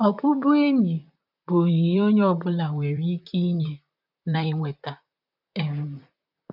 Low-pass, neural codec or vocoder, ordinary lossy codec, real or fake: 5.4 kHz; vocoder, 44.1 kHz, 128 mel bands, Pupu-Vocoder; none; fake